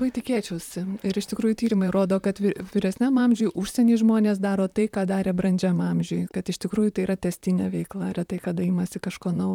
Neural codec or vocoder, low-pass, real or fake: vocoder, 44.1 kHz, 128 mel bands, Pupu-Vocoder; 19.8 kHz; fake